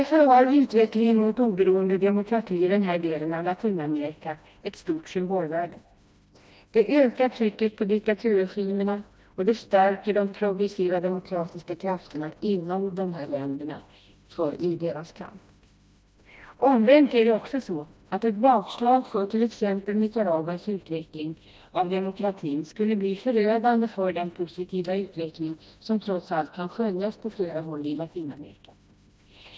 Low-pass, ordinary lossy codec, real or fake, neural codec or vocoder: none; none; fake; codec, 16 kHz, 1 kbps, FreqCodec, smaller model